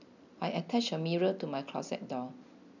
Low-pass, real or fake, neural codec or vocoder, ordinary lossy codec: 7.2 kHz; real; none; none